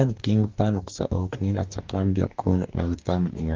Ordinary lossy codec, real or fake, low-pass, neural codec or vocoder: Opus, 24 kbps; fake; 7.2 kHz; codec, 44.1 kHz, 2.6 kbps, DAC